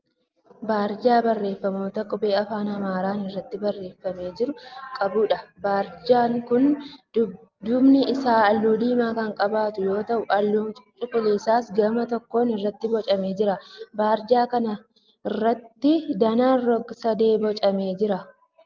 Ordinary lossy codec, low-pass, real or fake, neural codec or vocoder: Opus, 32 kbps; 7.2 kHz; real; none